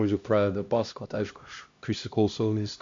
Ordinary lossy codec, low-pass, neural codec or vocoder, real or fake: MP3, 48 kbps; 7.2 kHz; codec, 16 kHz, 1 kbps, X-Codec, HuBERT features, trained on LibriSpeech; fake